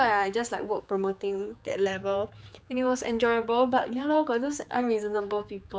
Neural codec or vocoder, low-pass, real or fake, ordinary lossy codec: codec, 16 kHz, 4 kbps, X-Codec, HuBERT features, trained on general audio; none; fake; none